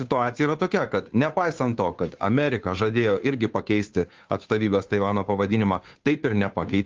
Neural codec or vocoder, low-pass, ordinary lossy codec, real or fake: codec, 16 kHz, 2 kbps, FunCodec, trained on Chinese and English, 25 frames a second; 7.2 kHz; Opus, 32 kbps; fake